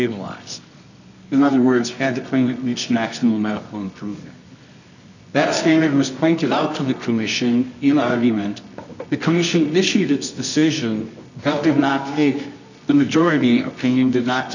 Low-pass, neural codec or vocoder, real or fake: 7.2 kHz; codec, 24 kHz, 0.9 kbps, WavTokenizer, medium music audio release; fake